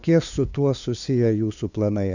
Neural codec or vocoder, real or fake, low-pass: codec, 16 kHz, 2 kbps, FunCodec, trained on LibriTTS, 25 frames a second; fake; 7.2 kHz